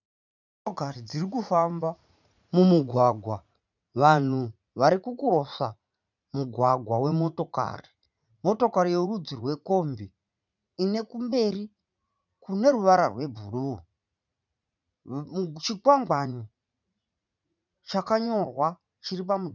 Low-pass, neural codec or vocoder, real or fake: 7.2 kHz; vocoder, 44.1 kHz, 80 mel bands, Vocos; fake